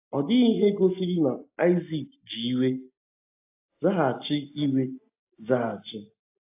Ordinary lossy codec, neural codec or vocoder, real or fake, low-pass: AAC, 32 kbps; none; real; 3.6 kHz